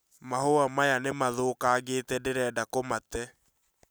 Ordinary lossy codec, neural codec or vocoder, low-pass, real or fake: none; none; none; real